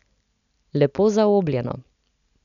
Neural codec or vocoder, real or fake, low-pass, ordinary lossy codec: none; real; 7.2 kHz; none